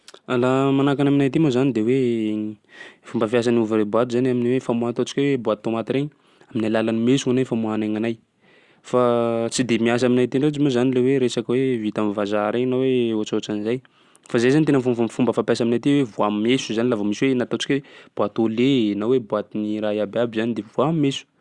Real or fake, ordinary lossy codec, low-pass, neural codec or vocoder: real; Opus, 64 kbps; 10.8 kHz; none